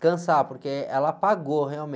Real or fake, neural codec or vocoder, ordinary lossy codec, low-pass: real; none; none; none